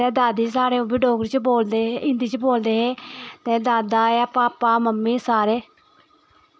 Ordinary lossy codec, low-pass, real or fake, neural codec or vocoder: none; none; real; none